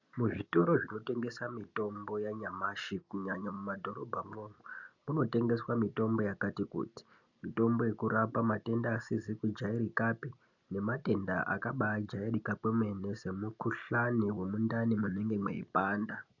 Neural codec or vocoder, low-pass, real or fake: none; 7.2 kHz; real